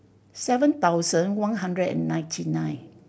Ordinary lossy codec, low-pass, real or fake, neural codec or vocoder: none; none; real; none